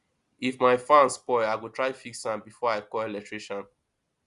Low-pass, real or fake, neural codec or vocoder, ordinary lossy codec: 10.8 kHz; real; none; Opus, 64 kbps